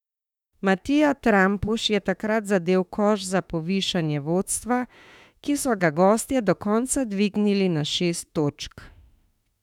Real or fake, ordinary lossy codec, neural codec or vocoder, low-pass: fake; none; autoencoder, 48 kHz, 32 numbers a frame, DAC-VAE, trained on Japanese speech; 19.8 kHz